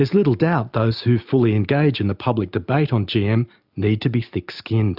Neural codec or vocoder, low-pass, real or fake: none; 5.4 kHz; real